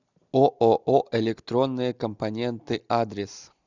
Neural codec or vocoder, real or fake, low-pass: none; real; 7.2 kHz